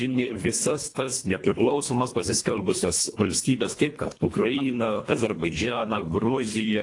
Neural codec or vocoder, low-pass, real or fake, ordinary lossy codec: codec, 24 kHz, 1.5 kbps, HILCodec; 10.8 kHz; fake; AAC, 48 kbps